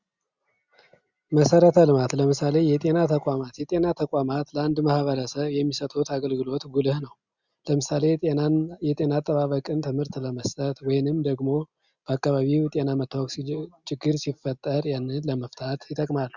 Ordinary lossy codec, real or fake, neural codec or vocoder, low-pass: Opus, 64 kbps; real; none; 7.2 kHz